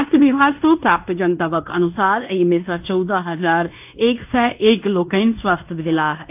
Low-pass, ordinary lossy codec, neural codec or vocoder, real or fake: 3.6 kHz; AAC, 32 kbps; codec, 16 kHz in and 24 kHz out, 0.9 kbps, LongCat-Audio-Codec, fine tuned four codebook decoder; fake